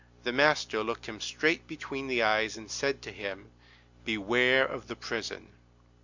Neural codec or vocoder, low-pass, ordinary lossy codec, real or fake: none; 7.2 kHz; Opus, 64 kbps; real